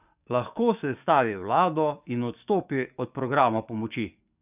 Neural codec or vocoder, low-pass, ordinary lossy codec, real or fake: codec, 44.1 kHz, 7.8 kbps, DAC; 3.6 kHz; none; fake